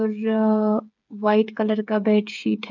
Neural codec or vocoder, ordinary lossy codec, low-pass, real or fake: codec, 16 kHz, 8 kbps, FreqCodec, smaller model; none; 7.2 kHz; fake